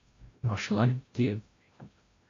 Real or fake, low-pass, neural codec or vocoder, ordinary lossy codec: fake; 7.2 kHz; codec, 16 kHz, 0.5 kbps, FreqCodec, larger model; AAC, 32 kbps